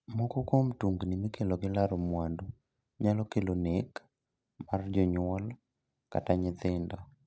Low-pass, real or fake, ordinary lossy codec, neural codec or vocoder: none; real; none; none